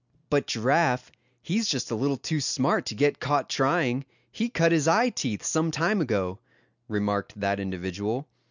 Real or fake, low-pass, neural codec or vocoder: real; 7.2 kHz; none